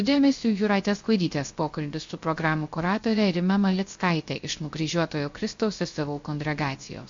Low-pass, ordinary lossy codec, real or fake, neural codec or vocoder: 7.2 kHz; MP3, 48 kbps; fake; codec, 16 kHz, 0.3 kbps, FocalCodec